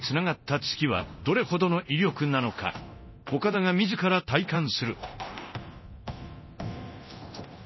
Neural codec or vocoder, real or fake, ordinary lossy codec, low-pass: codec, 24 kHz, 0.9 kbps, DualCodec; fake; MP3, 24 kbps; 7.2 kHz